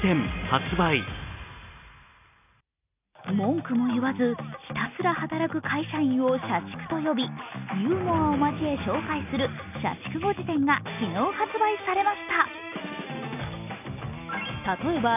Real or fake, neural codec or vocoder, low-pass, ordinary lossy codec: real; none; 3.6 kHz; none